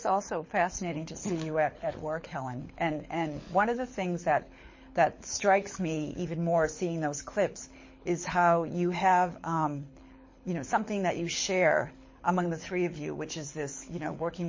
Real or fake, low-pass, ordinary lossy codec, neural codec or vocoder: fake; 7.2 kHz; MP3, 32 kbps; codec, 24 kHz, 6 kbps, HILCodec